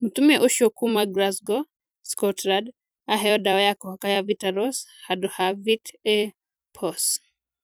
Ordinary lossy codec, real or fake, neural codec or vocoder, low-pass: none; fake; vocoder, 44.1 kHz, 128 mel bands every 256 samples, BigVGAN v2; none